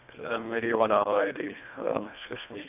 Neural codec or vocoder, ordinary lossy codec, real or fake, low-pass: codec, 24 kHz, 1.5 kbps, HILCodec; none; fake; 3.6 kHz